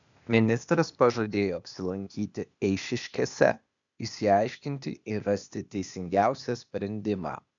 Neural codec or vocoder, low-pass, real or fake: codec, 16 kHz, 0.8 kbps, ZipCodec; 7.2 kHz; fake